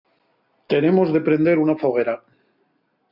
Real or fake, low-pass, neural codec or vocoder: real; 5.4 kHz; none